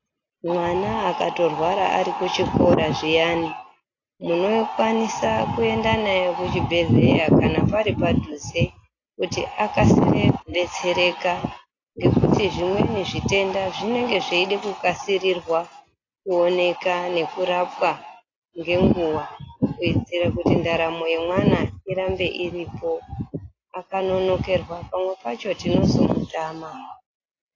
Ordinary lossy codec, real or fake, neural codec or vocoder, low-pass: AAC, 32 kbps; real; none; 7.2 kHz